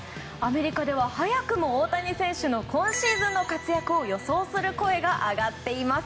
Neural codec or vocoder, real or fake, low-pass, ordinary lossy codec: none; real; none; none